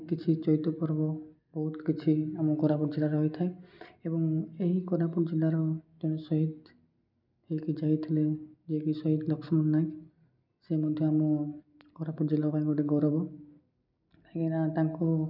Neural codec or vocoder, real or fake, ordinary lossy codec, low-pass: none; real; none; 5.4 kHz